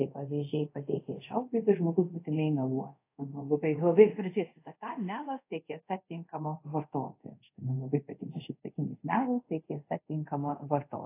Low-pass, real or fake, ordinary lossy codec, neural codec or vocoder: 3.6 kHz; fake; AAC, 24 kbps; codec, 24 kHz, 0.5 kbps, DualCodec